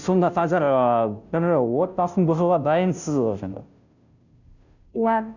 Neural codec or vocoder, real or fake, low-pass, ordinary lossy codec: codec, 16 kHz, 0.5 kbps, FunCodec, trained on Chinese and English, 25 frames a second; fake; 7.2 kHz; none